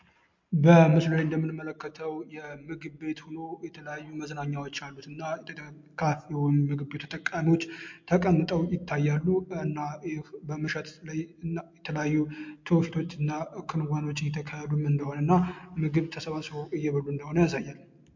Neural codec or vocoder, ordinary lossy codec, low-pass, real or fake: none; MP3, 48 kbps; 7.2 kHz; real